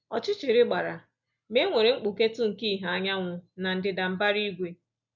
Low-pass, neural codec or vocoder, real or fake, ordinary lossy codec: 7.2 kHz; none; real; none